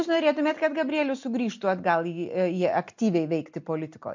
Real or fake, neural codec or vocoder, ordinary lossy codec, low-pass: real; none; MP3, 48 kbps; 7.2 kHz